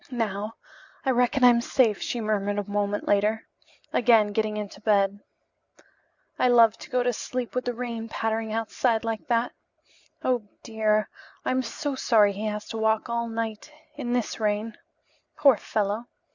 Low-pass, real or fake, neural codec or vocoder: 7.2 kHz; real; none